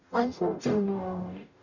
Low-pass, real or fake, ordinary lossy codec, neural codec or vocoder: 7.2 kHz; fake; none; codec, 44.1 kHz, 0.9 kbps, DAC